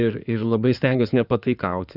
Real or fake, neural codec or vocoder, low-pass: fake; codec, 16 kHz in and 24 kHz out, 2.2 kbps, FireRedTTS-2 codec; 5.4 kHz